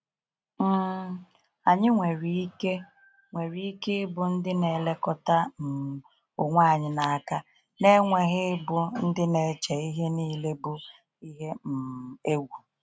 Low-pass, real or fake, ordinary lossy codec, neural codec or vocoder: none; real; none; none